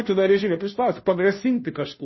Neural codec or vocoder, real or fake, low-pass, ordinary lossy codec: codec, 16 kHz, 0.5 kbps, FunCodec, trained on Chinese and English, 25 frames a second; fake; 7.2 kHz; MP3, 24 kbps